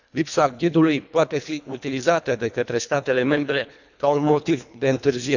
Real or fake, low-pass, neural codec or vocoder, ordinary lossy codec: fake; 7.2 kHz; codec, 24 kHz, 1.5 kbps, HILCodec; none